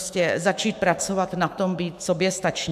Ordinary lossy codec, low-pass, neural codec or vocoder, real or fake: AAC, 96 kbps; 14.4 kHz; autoencoder, 48 kHz, 128 numbers a frame, DAC-VAE, trained on Japanese speech; fake